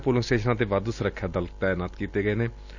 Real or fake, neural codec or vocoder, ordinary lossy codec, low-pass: real; none; none; 7.2 kHz